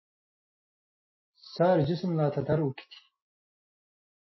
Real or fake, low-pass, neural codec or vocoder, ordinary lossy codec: real; 7.2 kHz; none; MP3, 24 kbps